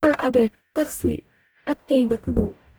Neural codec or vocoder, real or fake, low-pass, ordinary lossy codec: codec, 44.1 kHz, 0.9 kbps, DAC; fake; none; none